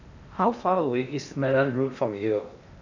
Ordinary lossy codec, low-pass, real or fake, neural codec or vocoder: none; 7.2 kHz; fake; codec, 16 kHz in and 24 kHz out, 0.8 kbps, FocalCodec, streaming, 65536 codes